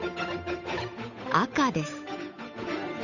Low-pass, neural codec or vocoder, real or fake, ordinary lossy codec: 7.2 kHz; codec, 16 kHz, 8 kbps, FunCodec, trained on Chinese and English, 25 frames a second; fake; none